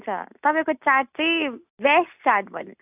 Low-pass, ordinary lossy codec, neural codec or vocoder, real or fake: 3.6 kHz; AAC, 32 kbps; none; real